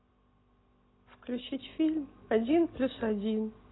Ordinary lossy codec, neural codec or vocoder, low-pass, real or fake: AAC, 16 kbps; none; 7.2 kHz; real